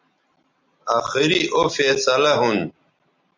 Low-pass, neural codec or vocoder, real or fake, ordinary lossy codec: 7.2 kHz; none; real; MP3, 64 kbps